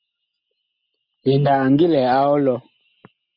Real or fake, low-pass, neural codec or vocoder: real; 5.4 kHz; none